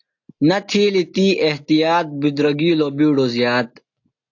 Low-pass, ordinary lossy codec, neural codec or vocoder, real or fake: 7.2 kHz; AAC, 48 kbps; none; real